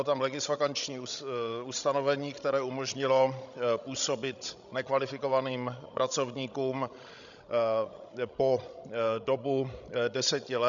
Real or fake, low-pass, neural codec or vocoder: fake; 7.2 kHz; codec, 16 kHz, 16 kbps, FreqCodec, larger model